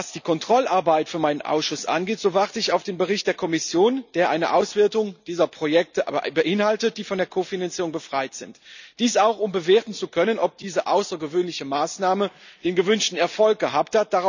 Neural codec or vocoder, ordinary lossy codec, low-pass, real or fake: none; none; 7.2 kHz; real